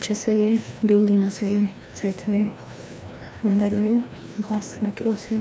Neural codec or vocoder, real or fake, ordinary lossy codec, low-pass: codec, 16 kHz, 1 kbps, FreqCodec, larger model; fake; none; none